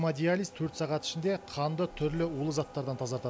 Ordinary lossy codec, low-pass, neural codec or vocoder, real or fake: none; none; none; real